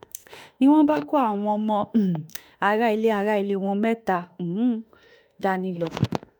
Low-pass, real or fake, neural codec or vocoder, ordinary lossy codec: none; fake; autoencoder, 48 kHz, 32 numbers a frame, DAC-VAE, trained on Japanese speech; none